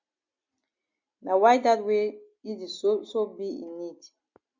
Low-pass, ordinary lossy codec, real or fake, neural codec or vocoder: 7.2 kHz; MP3, 48 kbps; real; none